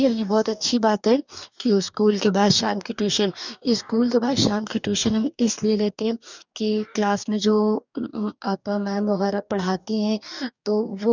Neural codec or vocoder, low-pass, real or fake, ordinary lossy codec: codec, 44.1 kHz, 2.6 kbps, DAC; 7.2 kHz; fake; none